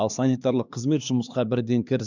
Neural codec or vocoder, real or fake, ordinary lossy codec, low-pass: codec, 16 kHz, 2 kbps, X-Codec, HuBERT features, trained on LibriSpeech; fake; none; 7.2 kHz